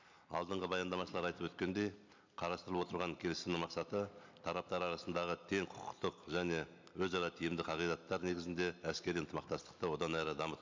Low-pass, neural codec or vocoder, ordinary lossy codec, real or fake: 7.2 kHz; none; MP3, 64 kbps; real